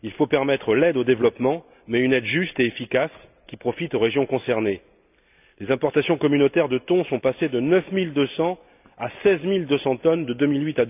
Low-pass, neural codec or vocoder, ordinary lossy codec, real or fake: 3.6 kHz; none; none; real